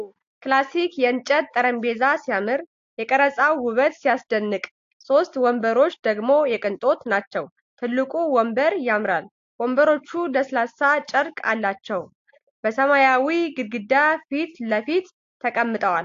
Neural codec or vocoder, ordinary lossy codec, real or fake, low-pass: none; AAC, 48 kbps; real; 7.2 kHz